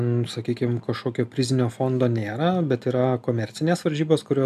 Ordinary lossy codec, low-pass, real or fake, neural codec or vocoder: AAC, 96 kbps; 14.4 kHz; real; none